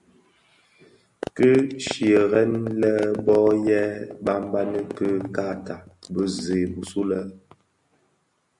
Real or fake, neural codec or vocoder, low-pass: real; none; 10.8 kHz